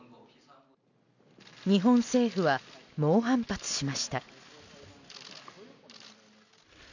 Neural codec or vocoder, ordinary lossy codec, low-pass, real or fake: none; none; 7.2 kHz; real